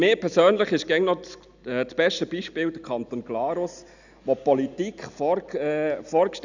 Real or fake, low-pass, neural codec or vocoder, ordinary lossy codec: real; 7.2 kHz; none; none